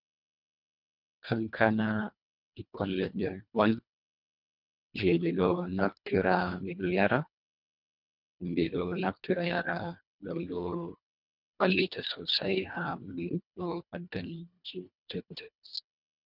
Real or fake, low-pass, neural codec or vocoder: fake; 5.4 kHz; codec, 24 kHz, 1.5 kbps, HILCodec